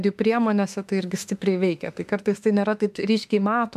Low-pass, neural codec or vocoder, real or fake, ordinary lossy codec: 14.4 kHz; autoencoder, 48 kHz, 32 numbers a frame, DAC-VAE, trained on Japanese speech; fake; MP3, 96 kbps